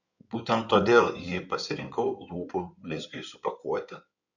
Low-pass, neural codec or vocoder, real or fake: 7.2 kHz; codec, 16 kHz in and 24 kHz out, 2.2 kbps, FireRedTTS-2 codec; fake